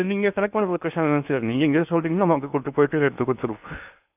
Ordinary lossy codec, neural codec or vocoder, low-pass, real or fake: none; codec, 16 kHz in and 24 kHz out, 0.8 kbps, FocalCodec, streaming, 65536 codes; 3.6 kHz; fake